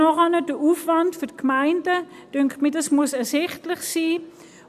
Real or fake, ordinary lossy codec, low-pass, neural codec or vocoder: real; none; 14.4 kHz; none